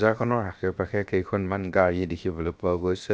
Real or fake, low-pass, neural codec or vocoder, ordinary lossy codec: fake; none; codec, 16 kHz, 0.7 kbps, FocalCodec; none